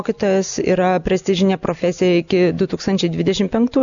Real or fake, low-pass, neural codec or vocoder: real; 7.2 kHz; none